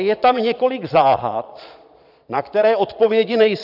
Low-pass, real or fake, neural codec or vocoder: 5.4 kHz; fake; vocoder, 22.05 kHz, 80 mel bands, Vocos